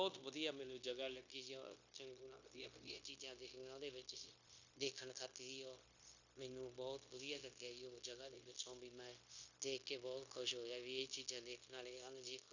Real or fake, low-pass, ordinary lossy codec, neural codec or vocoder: fake; 7.2 kHz; none; codec, 16 kHz, 0.9 kbps, LongCat-Audio-Codec